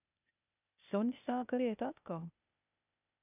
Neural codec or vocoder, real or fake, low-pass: codec, 16 kHz, 0.8 kbps, ZipCodec; fake; 3.6 kHz